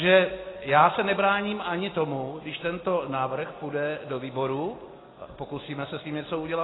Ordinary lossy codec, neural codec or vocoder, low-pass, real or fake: AAC, 16 kbps; none; 7.2 kHz; real